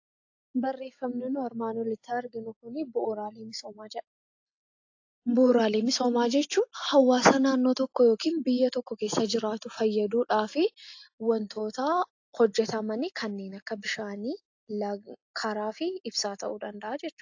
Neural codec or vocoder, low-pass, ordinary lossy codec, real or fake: none; 7.2 kHz; AAC, 48 kbps; real